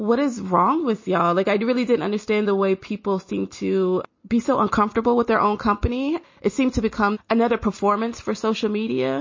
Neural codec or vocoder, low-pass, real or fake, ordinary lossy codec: none; 7.2 kHz; real; MP3, 32 kbps